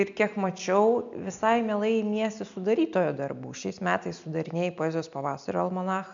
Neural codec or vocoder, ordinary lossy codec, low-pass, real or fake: none; MP3, 64 kbps; 7.2 kHz; real